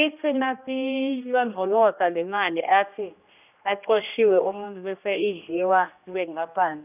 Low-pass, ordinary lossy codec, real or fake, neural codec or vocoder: 3.6 kHz; none; fake; codec, 16 kHz, 1 kbps, X-Codec, HuBERT features, trained on general audio